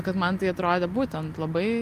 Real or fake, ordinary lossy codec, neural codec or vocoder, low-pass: real; Opus, 24 kbps; none; 14.4 kHz